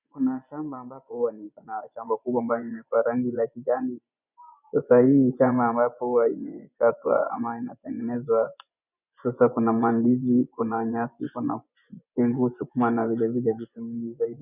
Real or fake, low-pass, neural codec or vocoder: fake; 3.6 kHz; vocoder, 24 kHz, 100 mel bands, Vocos